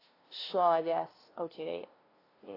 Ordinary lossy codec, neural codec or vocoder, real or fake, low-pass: AAC, 24 kbps; codec, 16 kHz, 0.5 kbps, FunCodec, trained on LibriTTS, 25 frames a second; fake; 5.4 kHz